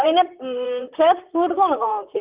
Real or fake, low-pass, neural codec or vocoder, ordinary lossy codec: fake; 3.6 kHz; vocoder, 44.1 kHz, 128 mel bands, Pupu-Vocoder; Opus, 32 kbps